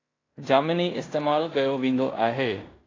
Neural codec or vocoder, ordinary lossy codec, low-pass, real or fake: codec, 16 kHz in and 24 kHz out, 0.9 kbps, LongCat-Audio-Codec, fine tuned four codebook decoder; AAC, 32 kbps; 7.2 kHz; fake